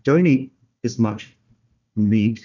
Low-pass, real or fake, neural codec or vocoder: 7.2 kHz; fake; codec, 16 kHz, 1 kbps, FunCodec, trained on Chinese and English, 50 frames a second